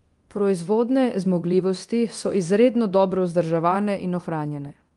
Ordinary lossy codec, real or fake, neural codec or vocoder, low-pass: Opus, 24 kbps; fake; codec, 24 kHz, 0.9 kbps, DualCodec; 10.8 kHz